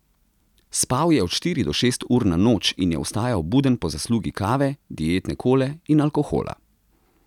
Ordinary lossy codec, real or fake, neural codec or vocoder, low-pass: none; real; none; 19.8 kHz